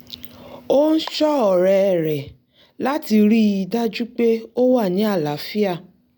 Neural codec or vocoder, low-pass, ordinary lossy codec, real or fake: none; 19.8 kHz; none; real